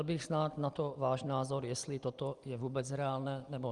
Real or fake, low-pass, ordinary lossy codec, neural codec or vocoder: real; 10.8 kHz; Opus, 32 kbps; none